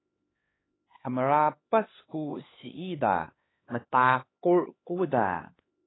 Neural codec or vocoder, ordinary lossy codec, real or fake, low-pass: codec, 16 kHz, 2 kbps, X-Codec, HuBERT features, trained on LibriSpeech; AAC, 16 kbps; fake; 7.2 kHz